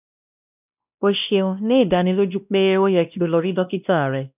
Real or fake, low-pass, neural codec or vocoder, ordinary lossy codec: fake; 3.6 kHz; codec, 16 kHz, 1 kbps, X-Codec, WavLM features, trained on Multilingual LibriSpeech; none